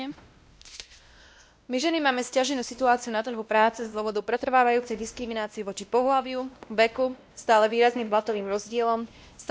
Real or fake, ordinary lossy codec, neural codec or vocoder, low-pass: fake; none; codec, 16 kHz, 1 kbps, X-Codec, WavLM features, trained on Multilingual LibriSpeech; none